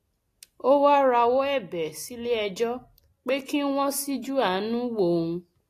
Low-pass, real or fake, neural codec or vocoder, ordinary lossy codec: 14.4 kHz; real; none; AAC, 48 kbps